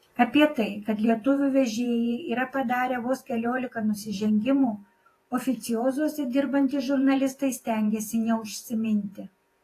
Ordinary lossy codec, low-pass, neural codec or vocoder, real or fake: AAC, 48 kbps; 14.4 kHz; vocoder, 48 kHz, 128 mel bands, Vocos; fake